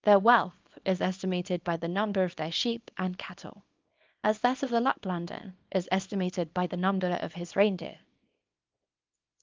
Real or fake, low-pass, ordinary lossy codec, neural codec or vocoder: fake; 7.2 kHz; Opus, 24 kbps; codec, 24 kHz, 0.9 kbps, WavTokenizer, small release